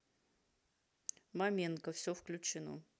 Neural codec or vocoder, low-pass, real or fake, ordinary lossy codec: none; none; real; none